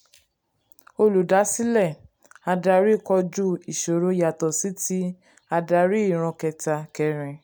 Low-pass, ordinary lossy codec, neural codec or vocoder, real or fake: none; none; none; real